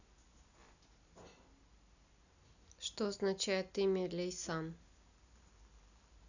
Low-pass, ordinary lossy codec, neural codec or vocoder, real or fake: 7.2 kHz; none; none; real